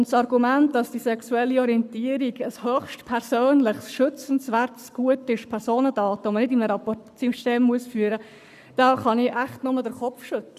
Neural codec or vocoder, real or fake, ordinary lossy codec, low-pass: codec, 44.1 kHz, 7.8 kbps, Pupu-Codec; fake; none; 14.4 kHz